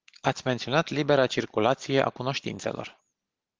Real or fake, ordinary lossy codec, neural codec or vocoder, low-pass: real; Opus, 16 kbps; none; 7.2 kHz